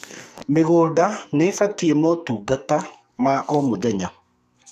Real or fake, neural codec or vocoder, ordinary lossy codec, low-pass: fake; codec, 44.1 kHz, 2.6 kbps, SNAC; none; 14.4 kHz